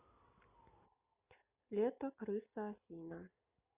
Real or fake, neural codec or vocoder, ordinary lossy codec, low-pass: real; none; Opus, 64 kbps; 3.6 kHz